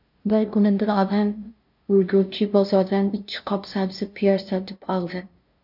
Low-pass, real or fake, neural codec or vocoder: 5.4 kHz; fake; codec, 16 kHz, 0.5 kbps, FunCodec, trained on LibriTTS, 25 frames a second